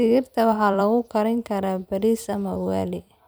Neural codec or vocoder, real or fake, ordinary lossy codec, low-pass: vocoder, 44.1 kHz, 128 mel bands every 256 samples, BigVGAN v2; fake; none; none